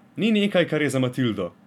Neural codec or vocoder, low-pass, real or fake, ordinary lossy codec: none; 19.8 kHz; real; none